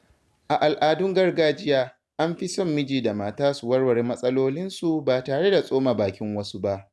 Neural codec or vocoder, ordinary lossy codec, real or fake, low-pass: none; none; real; none